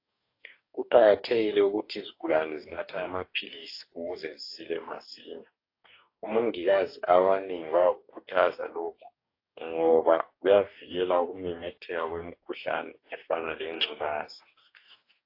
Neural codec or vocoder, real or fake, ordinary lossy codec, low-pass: codec, 44.1 kHz, 2.6 kbps, DAC; fake; AAC, 32 kbps; 5.4 kHz